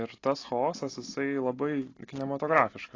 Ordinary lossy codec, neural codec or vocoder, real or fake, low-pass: AAC, 32 kbps; none; real; 7.2 kHz